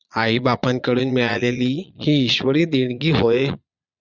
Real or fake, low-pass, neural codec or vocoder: fake; 7.2 kHz; vocoder, 22.05 kHz, 80 mel bands, Vocos